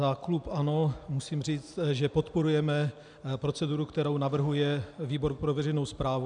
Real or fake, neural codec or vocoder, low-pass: real; none; 9.9 kHz